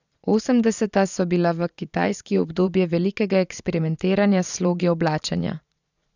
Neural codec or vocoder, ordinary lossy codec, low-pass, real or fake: vocoder, 22.05 kHz, 80 mel bands, Vocos; none; 7.2 kHz; fake